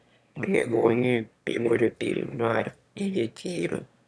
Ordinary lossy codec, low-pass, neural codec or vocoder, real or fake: none; none; autoencoder, 22.05 kHz, a latent of 192 numbers a frame, VITS, trained on one speaker; fake